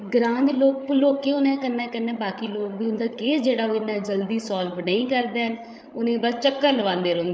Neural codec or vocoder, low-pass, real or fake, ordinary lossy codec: codec, 16 kHz, 8 kbps, FreqCodec, larger model; none; fake; none